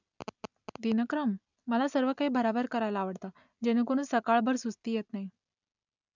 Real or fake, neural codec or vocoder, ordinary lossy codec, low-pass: real; none; none; 7.2 kHz